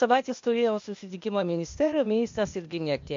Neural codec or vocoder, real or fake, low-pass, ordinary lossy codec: codec, 16 kHz, 0.8 kbps, ZipCodec; fake; 7.2 kHz; MP3, 48 kbps